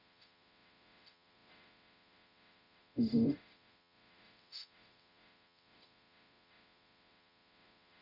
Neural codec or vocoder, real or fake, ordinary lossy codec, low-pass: codec, 44.1 kHz, 0.9 kbps, DAC; fake; none; 5.4 kHz